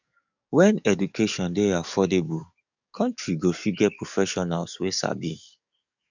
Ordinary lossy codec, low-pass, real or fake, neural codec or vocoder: none; 7.2 kHz; fake; codec, 44.1 kHz, 7.8 kbps, DAC